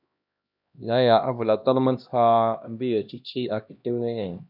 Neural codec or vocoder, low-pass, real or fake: codec, 16 kHz, 1 kbps, X-Codec, HuBERT features, trained on LibriSpeech; 5.4 kHz; fake